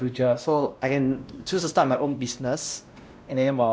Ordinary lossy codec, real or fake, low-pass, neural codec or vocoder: none; fake; none; codec, 16 kHz, 1 kbps, X-Codec, WavLM features, trained on Multilingual LibriSpeech